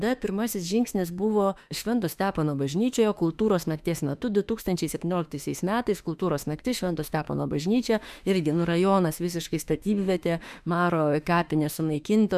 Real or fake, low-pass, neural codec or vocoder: fake; 14.4 kHz; autoencoder, 48 kHz, 32 numbers a frame, DAC-VAE, trained on Japanese speech